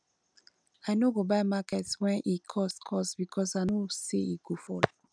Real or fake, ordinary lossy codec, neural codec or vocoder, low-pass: real; none; none; 9.9 kHz